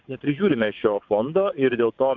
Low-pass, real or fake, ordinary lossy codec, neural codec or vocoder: 7.2 kHz; fake; MP3, 64 kbps; vocoder, 22.05 kHz, 80 mel bands, WaveNeXt